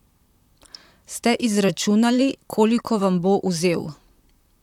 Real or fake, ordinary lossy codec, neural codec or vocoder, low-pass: fake; none; vocoder, 44.1 kHz, 128 mel bands, Pupu-Vocoder; 19.8 kHz